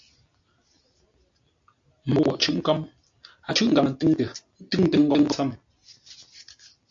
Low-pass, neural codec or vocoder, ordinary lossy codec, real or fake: 7.2 kHz; none; AAC, 64 kbps; real